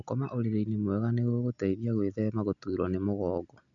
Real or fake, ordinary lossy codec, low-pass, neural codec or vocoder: real; none; 7.2 kHz; none